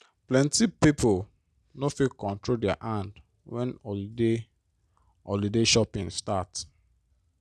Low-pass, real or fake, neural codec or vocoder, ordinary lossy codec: none; real; none; none